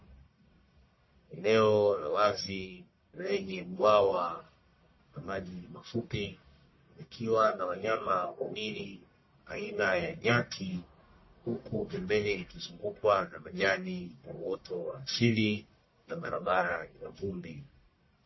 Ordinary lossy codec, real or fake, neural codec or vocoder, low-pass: MP3, 24 kbps; fake; codec, 44.1 kHz, 1.7 kbps, Pupu-Codec; 7.2 kHz